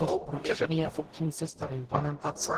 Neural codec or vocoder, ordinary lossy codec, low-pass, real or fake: codec, 44.1 kHz, 0.9 kbps, DAC; Opus, 16 kbps; 14.4 kHz; fake